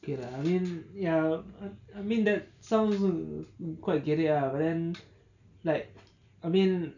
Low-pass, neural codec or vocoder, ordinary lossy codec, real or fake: 7.2 kHz; none; none; real